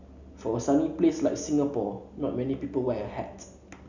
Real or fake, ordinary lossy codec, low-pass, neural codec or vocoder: real; none; 7.2 kHz; none